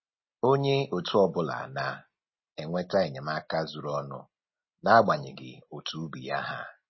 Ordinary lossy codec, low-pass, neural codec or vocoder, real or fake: MP3, 24 kbps; 7.2 kHz; none; real